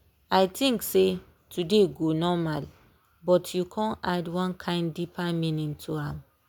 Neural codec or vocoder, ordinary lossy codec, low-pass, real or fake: none; none; none; real